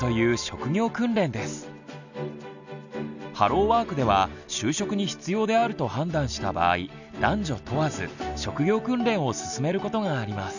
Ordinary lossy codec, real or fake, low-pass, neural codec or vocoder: none; real; 7.2 kHz; none